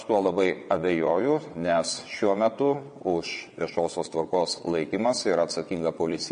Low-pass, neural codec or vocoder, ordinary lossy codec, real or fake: 9.9 kHz; vocoder, 22.05 kHz, 80 mel bands, WaveNeXt; MP3, 48 kbps; fake